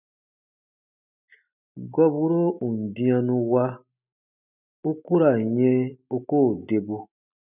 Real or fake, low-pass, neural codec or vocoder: real; 3.6 kHz; none